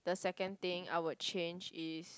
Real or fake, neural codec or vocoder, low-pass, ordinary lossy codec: real; none; none; none